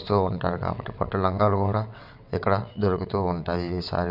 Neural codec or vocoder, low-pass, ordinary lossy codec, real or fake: vocoder, 22.05 kHz, 80 mel bands, WaveNeXt; 5.4 kHz; none; fake